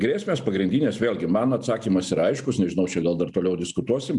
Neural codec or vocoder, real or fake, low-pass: none; real; 10.8 kHz